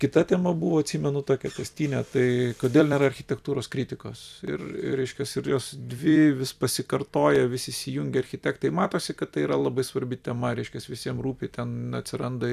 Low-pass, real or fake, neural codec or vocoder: 14.4 kHz; fake; vocoder, 44.1 kHz, 128 mel bands every 256 samples, BigVGAN v2